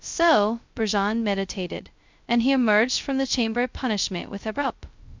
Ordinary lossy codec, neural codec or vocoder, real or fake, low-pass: MP3, 64 kbps; codec, 16 kHz, 0.2 kbps, FocalCodec; fake; 7.2 kHz